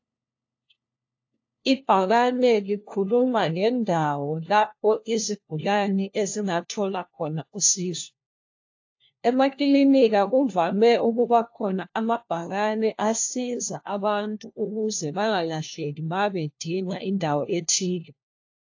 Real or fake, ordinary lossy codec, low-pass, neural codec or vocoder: fake; AAC, 48 kbps; 7.2 kHz; codec, 16 kHz, 1 kbps, FunCodec, trained on LibriTTS, 50 frames a second